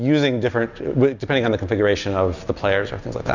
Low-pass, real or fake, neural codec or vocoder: 7.2 kHz; real; none